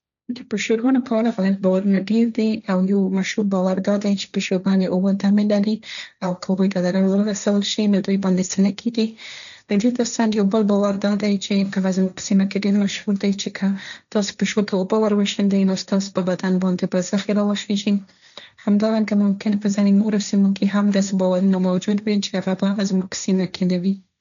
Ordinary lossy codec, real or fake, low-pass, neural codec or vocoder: none; fake; 7.2 kHz; codec, 16 kHz, 1.1 kbps, Voila-Tokenizer